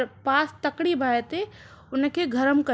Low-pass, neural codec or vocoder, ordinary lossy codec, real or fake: none; none; none; real